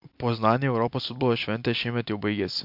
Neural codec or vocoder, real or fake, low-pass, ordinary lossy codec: none; real; 5.4 kHz; MP3, 48 kbps